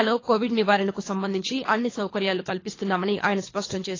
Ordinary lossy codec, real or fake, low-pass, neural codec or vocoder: AAC, 32 kbps; fake; 7.2 kHz; codec, 24 kHz, 3 kbps, HILCodec